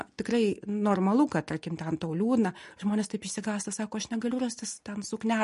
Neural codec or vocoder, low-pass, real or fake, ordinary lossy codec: none; 10.8 kHz; real; MP3, 48 kbps